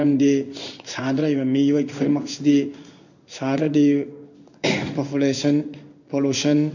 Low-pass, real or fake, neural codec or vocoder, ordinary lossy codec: 7.2 kHz; fake; codec, 16 kHz in and 24 kHz out, 1 kbps, XY-Tokenizer; none